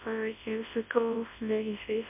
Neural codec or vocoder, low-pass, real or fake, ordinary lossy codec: codec, 24 kHz, 0.9 kbps, WavTokenizer, large speech release; 3.6 kHz; fake; MP3, 32 kbps